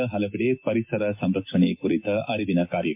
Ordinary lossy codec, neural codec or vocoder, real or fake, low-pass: MP3, 32 kbps; none; real; 3.6 kHz